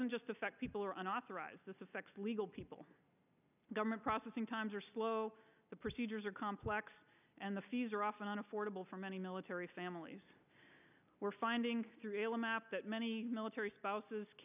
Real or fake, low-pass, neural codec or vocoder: real; 3.6 kHz; none